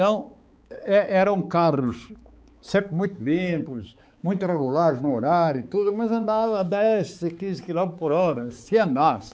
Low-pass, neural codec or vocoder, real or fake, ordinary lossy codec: none; codec, 16 kHz, 4 kbps, X-Codec, HuBERT features, trained on balanced general audio; fake; none